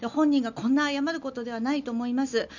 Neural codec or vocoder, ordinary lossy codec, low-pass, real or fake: none; none; 7.2 kHz; real